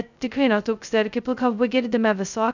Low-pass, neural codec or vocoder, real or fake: 7.2 kHz; codec, 16 kHz, 0.2 kbps, FocalCodec; fake